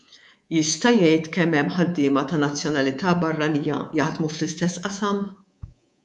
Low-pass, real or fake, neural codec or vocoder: 10.8 kHz; fake; codec, 24 kHz, 3.1 kbps, DualCodec